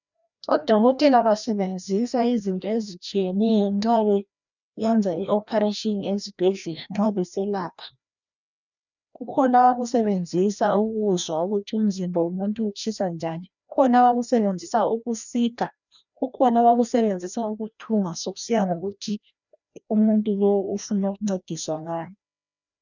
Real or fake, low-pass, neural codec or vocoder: fake; 7.2 kHz; codec, 16 kHz, 1 kbps, FreqCodec, larger model